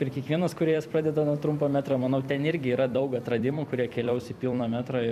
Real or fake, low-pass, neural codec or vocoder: fake; 14.4 kHz; vocoder, 44.1 kHz, 128 mel bands every 256 samples, BigVGAN v2